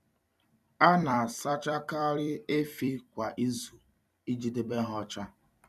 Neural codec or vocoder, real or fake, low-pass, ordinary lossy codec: vocoder, 44.1 kHz, 128 mel bands every 256 samples, BigVGAN v2; fake; 14.4 kHz; none